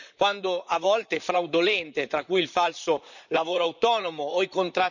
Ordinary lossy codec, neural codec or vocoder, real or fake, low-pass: none; vocoder, 44.1 kHz, 128 mel bands, Pupu-Vocoder; fake; 7.2 kHz